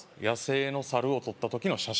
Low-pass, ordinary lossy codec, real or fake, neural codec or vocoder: none; none; real; none